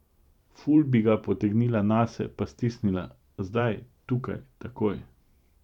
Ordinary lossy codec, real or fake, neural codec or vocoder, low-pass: none; fake; vocoder, 44.1 kHz, 128 mel bands every 512 samples, BigVGAN v2; 19.8 kHz